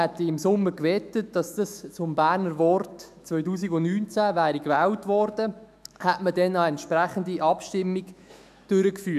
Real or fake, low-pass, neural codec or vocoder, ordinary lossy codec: fake; 14.4 kHz; autoencoder, 48 kHz, 128 numbers a frame, DAC-VAE, trained on Japanese speech; none